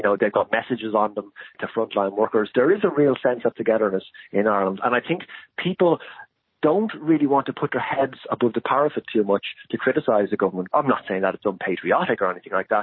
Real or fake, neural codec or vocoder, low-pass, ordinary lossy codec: real; none; 7.2 kHz; MP3, 24 kbps